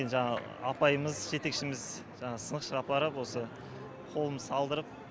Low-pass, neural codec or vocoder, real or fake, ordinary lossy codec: none; none; real; none